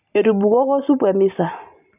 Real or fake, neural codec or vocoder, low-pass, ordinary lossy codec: real; none; 3.6 kHz; none